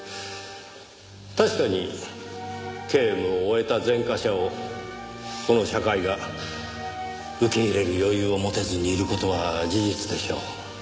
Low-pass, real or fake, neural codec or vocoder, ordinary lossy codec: none; real; none; none